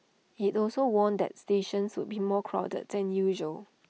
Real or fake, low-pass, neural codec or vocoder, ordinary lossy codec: real; none; none; none